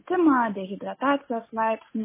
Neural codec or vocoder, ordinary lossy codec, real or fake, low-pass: none; MP3, 16 kbps; real; 3.6 kHz